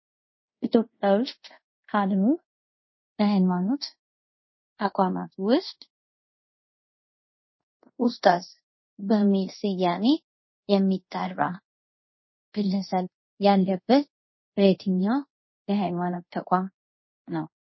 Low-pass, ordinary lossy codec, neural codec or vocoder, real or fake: 7.2 kHz; MP3, 24 kbps; codec, 24 kHz, 0.5 kbps, DualCodec; fake